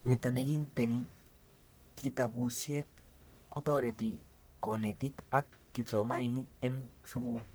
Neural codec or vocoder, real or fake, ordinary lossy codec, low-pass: codec, 44.1 kHz, 1.7 kbps, Pupu-Codec; fake; none; none